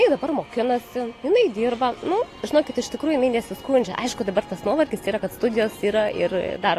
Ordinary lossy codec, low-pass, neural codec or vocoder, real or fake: AAC, 48 kbps; 14.4 kHz; none; real